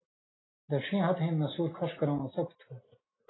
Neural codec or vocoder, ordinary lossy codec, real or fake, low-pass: none; AAC, 16 kbps; real; 7.2 kHz